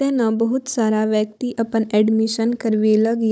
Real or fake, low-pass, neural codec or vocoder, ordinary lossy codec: fake; none; codec, 16 kHz, 16 kbps, FunCodec, trained on Chinese and English, 50 frames a second; none